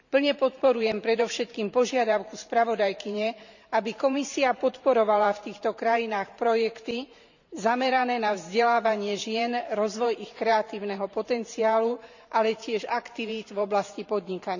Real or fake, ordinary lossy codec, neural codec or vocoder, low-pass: fake; none; vocoder, 44.1 kHz, 128 mel bands every 512 samples, BigVGAN v2; 7.2 kHz